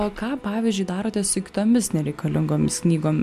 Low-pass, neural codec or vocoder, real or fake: 14.4 kHz; none; real